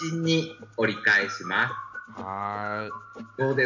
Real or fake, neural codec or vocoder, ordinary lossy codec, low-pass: real; none; none; 7.2 kHz